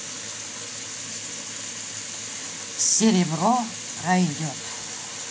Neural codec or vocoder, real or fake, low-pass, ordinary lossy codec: none; real; none; none